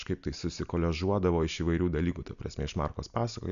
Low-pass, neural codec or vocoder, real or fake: 7.2 kHz; none; real